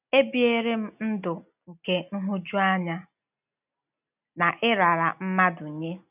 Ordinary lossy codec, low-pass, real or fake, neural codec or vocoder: none; 3.6 kHz; real; none